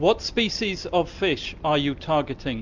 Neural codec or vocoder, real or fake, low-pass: none; real; 7.2 kHz